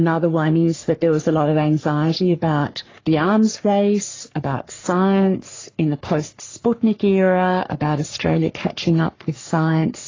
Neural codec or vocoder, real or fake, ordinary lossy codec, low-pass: codec, 44.1 kHz, 3.4 kbps, Pupu-Codec; fake; AAC, 32 kbps; 7.2 kHz